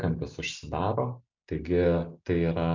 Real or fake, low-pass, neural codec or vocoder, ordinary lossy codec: real; 7.2 kHz; none; MP3, 64 kbps